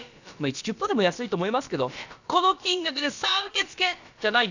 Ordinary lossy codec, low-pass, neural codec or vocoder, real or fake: none; 7.2 kHz; codec, 16 kHz, about 1 kbps, DyCAST, with the encoder's durations; fake